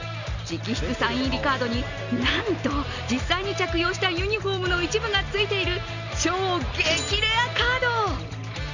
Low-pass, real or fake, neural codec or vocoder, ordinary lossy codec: 7.2 kHz; real; none; Opus, 64 kbps